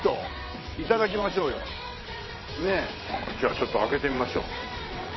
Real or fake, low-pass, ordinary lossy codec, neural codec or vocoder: fake; 7.2 kHz; MP3, 24 kbps; vocoder, 22.05 kHz, 80 mel bands, WaveNeXt